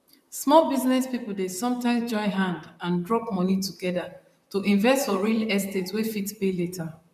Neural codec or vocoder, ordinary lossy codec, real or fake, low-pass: vocoder, 44.1 kHz, 128 mel bands, Pupu-Vocoder; none; fake; 14.4 kHz